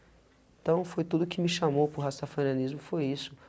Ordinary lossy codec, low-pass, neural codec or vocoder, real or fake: none; none; none; real